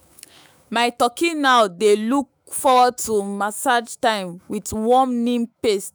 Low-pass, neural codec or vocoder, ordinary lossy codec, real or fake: none; autoencoder, 48 kHz, 128 numbers a frame, DAC-VAE, trained on Japanese speech; none; fake